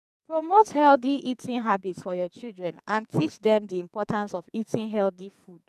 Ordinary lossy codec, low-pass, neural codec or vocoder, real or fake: none; 14.4 kHz; codec, 44.1 kHz, 2.6 kbps, SNAC; fake